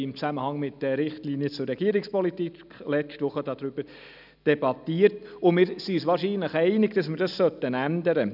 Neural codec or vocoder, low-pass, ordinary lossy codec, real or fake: none; 5.4 kHz; none; real